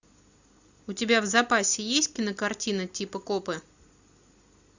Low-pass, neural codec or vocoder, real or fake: 7.2 kHz; none; real